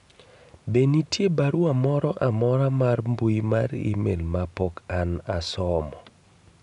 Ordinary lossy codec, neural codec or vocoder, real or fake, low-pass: none; none; real; 10.8 kHz